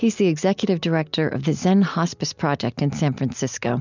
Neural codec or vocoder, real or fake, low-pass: none; real; 7.2 kHz